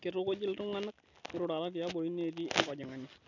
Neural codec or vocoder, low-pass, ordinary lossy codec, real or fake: none; 7.2 kHz; none; real